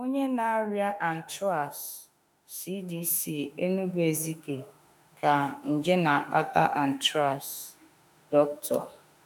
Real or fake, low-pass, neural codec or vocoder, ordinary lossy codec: fake; none; autoencoder, 48 kHz, 32 numbers a frame, DAC-VAE, trained on Japanese speech; none